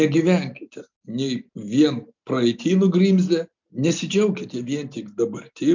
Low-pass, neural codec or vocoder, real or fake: 7.2 kHz; none; real